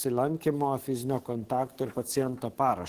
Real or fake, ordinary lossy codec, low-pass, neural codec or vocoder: fake; Opus, 16 kbps; 14.4 kHz; codec, 44.1 kHz, 7.8 kbps, DAC